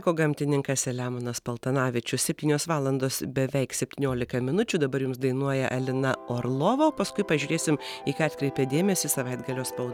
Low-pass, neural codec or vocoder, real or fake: 19.8 kHz; none; real